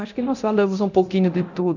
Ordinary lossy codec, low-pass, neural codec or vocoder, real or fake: none; 7.2 kHz; codec, 24 kHz, 0.9 kbps, DualCodec; fake